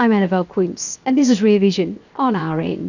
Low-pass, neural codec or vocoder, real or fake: 7.2 kHz; codec, 16 kHz, 0.7 kbps, FocalCodec; fake